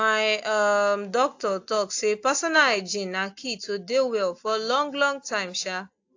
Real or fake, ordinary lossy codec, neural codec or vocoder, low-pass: real; AAC, 48 kbps; none; 7.2 kHz